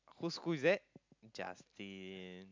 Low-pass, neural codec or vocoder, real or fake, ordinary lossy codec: 7.2 kHz; none; real; MP3, 64 kbps